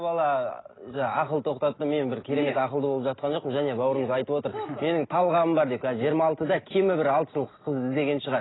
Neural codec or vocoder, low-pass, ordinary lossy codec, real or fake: none; 7.2 kHz; AAC, 16 kbps; real